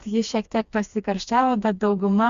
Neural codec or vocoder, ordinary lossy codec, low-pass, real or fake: codec, 16 kHz, 2 kbps, FreqCodec, smaller model; Opus, 64 kbps; 7.2 kHz; fake